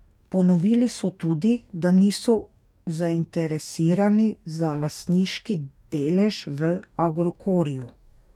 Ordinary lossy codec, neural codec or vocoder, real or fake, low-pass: none; codec, 44.1 kHz, 2.6 kbps, DAC; fake; 19.8 kHz